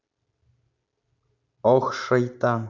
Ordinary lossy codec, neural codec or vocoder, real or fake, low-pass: none; none; real; 7.2 kHz